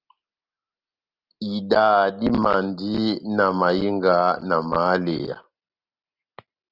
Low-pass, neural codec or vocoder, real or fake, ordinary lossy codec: 5.4 kHz; none; real; Opus, 32 kbps